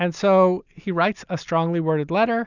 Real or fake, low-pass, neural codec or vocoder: real; 7.2 kHz; none